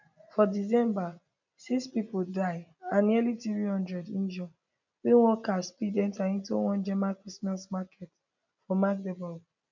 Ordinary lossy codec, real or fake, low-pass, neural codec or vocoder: none; real; 7.2 kHz; none